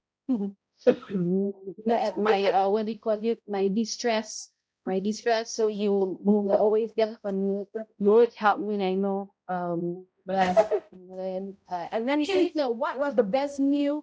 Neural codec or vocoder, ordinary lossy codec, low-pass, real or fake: codec, 16 kHz, 0.5 kbps, X-Codec, HuBERT features, trained on balanced general audio; none; none; fake